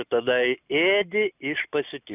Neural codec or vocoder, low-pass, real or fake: none; 3.6 kHz; real